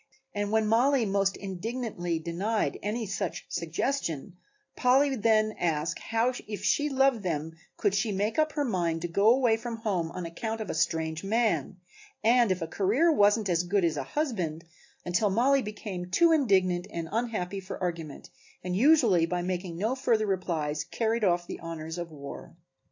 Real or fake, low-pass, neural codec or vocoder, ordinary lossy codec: real; 7.2 kHz; none; AAC, 48 kbps